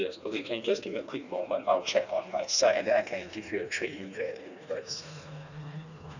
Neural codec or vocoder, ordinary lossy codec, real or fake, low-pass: codec, 16 kHz, 2 kbps, FreqCodec, smaller model; none; fake; 7.2 kHz